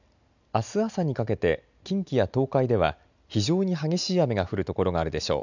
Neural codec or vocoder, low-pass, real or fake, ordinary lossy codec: none; 7.2 kHz; real; none